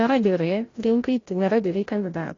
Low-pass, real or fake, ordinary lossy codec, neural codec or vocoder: 7.2 kHz; fake; AAC, 32 kbps; codec, 16 kHz, 0.5 kbps, FreqCodec, larger model